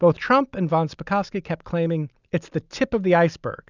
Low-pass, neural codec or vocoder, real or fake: 7.2 kHz; none; real